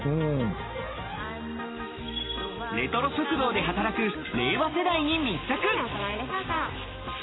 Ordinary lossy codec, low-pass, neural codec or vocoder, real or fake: AAC, 16 kbps; 7.2 kHz; none; real